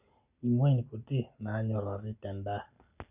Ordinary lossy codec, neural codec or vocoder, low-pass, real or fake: none; none; 3.6 kHz; real